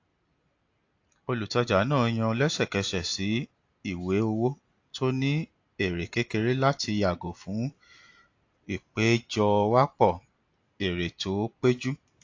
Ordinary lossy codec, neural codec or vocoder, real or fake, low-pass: AAC, 48 kbps; none; real; 7.2 kHz